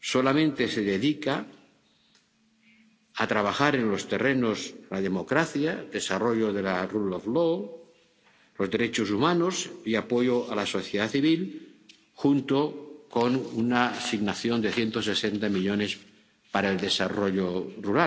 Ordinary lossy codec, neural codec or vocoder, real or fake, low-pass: none; none; real; none